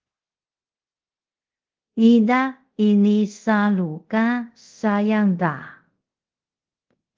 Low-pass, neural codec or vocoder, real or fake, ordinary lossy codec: 7.2 kHz; codec, 24 kHz, 0.5 kbps, DualCodec; fake; Opus, 16 kbps